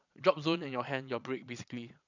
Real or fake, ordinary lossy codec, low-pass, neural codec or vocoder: fake; none; 7.2 kHz; vocoder, 22.05 kHz, 80 mel bands, Vocos